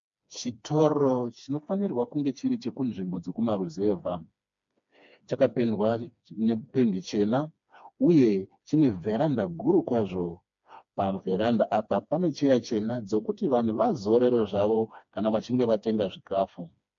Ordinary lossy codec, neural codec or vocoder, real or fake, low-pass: MP3, 48 kbps; codec, 16 kHz, 2 kbps, FreqCodec, smaller model; fake; 7.2 kHz